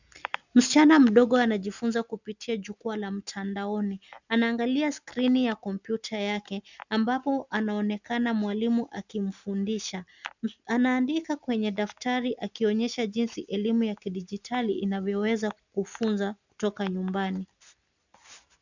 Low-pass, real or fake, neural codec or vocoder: 7.2 kHz; real; none